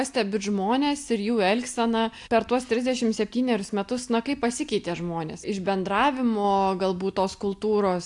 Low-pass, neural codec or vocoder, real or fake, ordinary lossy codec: 10.8 kHz; none; real; AAC, 64 kbps